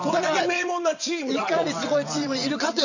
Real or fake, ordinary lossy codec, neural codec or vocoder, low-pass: fake; none; vocoder, 22.05 kHz, 80 mel bands, WaveNeXt; 7.2 kHz